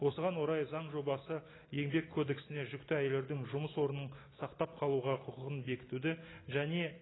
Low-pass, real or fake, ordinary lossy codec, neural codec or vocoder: 7.2 kHz; real; AAC, 16 kbps; none